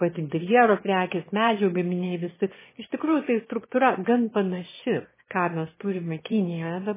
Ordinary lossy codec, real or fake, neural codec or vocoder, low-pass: MP3, 16 kbps; fake; autoencoder, 22.05 kHz, a latent of 192 numbers a frame, VITS, trained on one speaker; 3.6 kHz